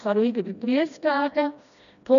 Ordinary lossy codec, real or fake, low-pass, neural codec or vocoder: none; fake; 7.2 kHz; codec, 16 kHz, 1 kbps, FreqCodec, smaller model